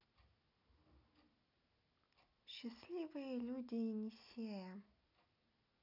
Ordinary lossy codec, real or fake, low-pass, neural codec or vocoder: none; real; 5.4 kHz; none